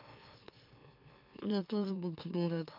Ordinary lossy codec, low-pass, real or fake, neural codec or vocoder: MP3, 48 kbps; 5.4 kHz; fake; autoencoder, 44.1 kHz, a latent of 192 numbers a frame, MeloTTS